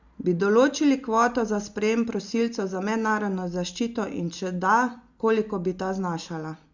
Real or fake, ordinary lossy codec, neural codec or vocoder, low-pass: real; Opus, 64 kbps; none; 7.2 kHz